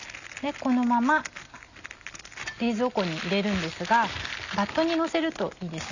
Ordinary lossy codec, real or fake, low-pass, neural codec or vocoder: none; real; 7.2 kHz; none